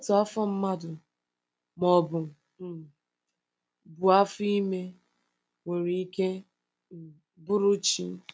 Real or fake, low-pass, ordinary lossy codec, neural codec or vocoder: real; none; none; none